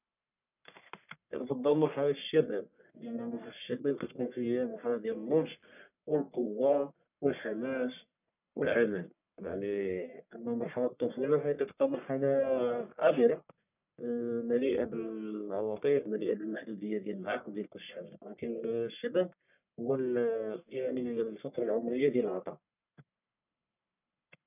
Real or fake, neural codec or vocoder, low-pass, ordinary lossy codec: fake; codec, 44.1 kHz, 1.7 kbps, Pupu-Codec; 3.6 kHz; none